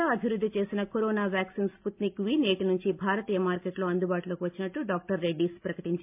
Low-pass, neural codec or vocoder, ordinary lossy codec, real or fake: 3.6 kHz; none; MP3, 32 kbps; real